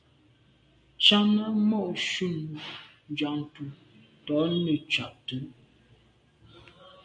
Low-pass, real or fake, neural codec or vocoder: 9.9 kHz; real; none